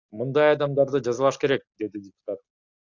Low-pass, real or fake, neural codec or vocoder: 7.2 kHz; real; none